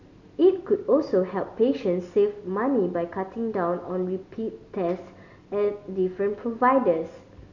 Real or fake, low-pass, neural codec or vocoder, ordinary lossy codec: real; 7.2 kHz; none; none